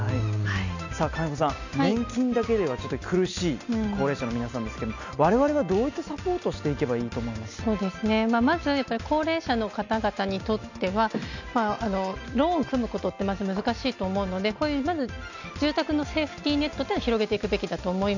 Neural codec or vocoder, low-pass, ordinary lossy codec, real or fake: none; 7.2 kHz; none; real